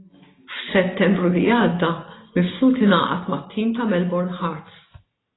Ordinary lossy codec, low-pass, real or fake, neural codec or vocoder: AAC, 16 kbps; 7.2 kHz; real; none